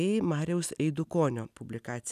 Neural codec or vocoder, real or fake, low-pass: none; real; 14.4 kHz